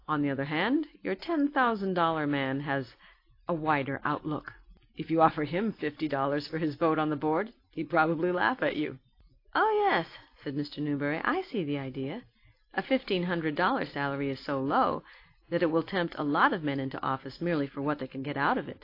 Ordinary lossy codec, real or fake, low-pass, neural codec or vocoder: AAC, 32 kbps; real; 5.4 kHz; none